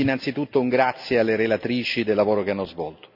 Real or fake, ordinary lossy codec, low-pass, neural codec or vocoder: real; none; 5.4 kHz; none